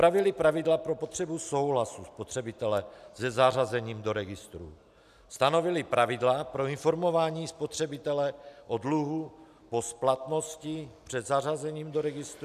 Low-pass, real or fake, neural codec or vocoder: 14.4 kHz; real; none